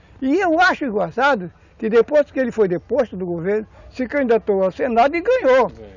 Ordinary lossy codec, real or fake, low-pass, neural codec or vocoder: none; real; 7.2 kHz; none